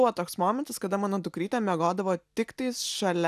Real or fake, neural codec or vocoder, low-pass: real; none; 14.4 kHz